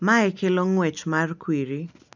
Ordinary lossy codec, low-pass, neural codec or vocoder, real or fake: none; 7.2 kHz; none; real